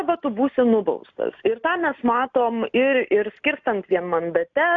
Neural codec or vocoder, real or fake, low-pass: none; real; 7.2 kHz